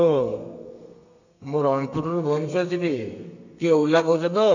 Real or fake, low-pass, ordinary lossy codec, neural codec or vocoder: fake; 7.2 kHz; none; codec, 32 kHz, 1.9 kbps, SNAC